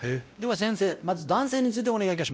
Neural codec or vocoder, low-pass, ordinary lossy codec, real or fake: codec, 16 kHz, 0.5 kbps, X-Codec, WavLM features, trained on Multilingual LibriSpeech; none; none; fake